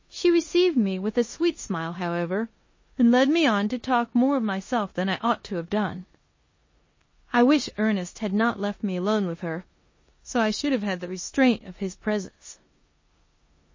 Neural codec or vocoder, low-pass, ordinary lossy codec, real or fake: codec, 16 kHz in and 24 kHz out, 0.9 kbps, LongCat-Audio-Codec, four codebook decoder; 7.2 kHz; MP3, 32 kbps; fake